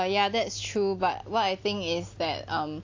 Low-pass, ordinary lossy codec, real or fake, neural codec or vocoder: 7.2 kHz; AAC, 48 kbps; real; none